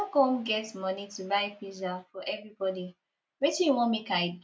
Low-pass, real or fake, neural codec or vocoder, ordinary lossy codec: none; real; none; none